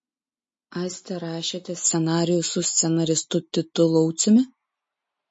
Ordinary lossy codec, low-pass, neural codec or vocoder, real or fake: MP3, 32 kbps; 9.9 kHz; none; real